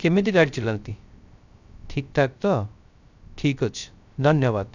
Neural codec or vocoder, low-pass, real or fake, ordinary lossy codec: codec, 16 kHz, 0.3 kbps, FocalCodec; 7.2 kHz; fake; none